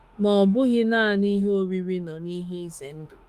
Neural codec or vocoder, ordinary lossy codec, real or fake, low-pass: autoencoder, 48 kHz, 32 numbers a frame, DAC-VAE, trained on Japanese speech; Opus, 32 kbps; fake; 14.4 kHz